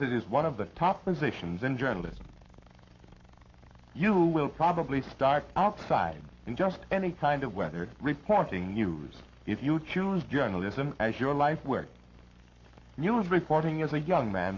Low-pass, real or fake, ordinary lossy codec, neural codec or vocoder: 7.2 kHz; fake; MP3, 48 kbps; codec, 44.1 kHz, 7.8 kbps, Pupu-Codec